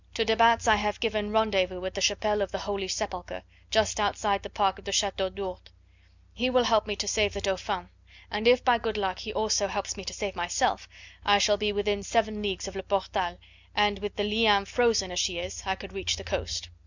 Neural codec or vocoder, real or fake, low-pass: none; real; 7.2 kHz